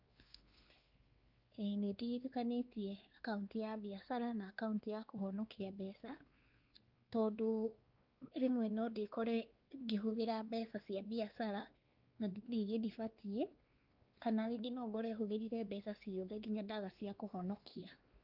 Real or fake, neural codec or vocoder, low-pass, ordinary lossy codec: fake; codec, 16 kHz, 2 kbps, X-Codec, WavLM features, trained on Multilingual LibriSpeech; 5.4 kHz; Opus, 24 kbps